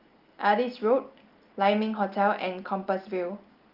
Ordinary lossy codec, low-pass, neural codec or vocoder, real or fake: Opus, 32 kbps; 5.4 kHz; none; real